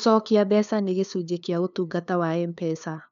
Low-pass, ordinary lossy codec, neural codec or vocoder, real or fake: 7.2 kHz; none; codec, 16 kHz, 2 kbps, FunCodec, trained on LibriTTS, 25 frames a second; fake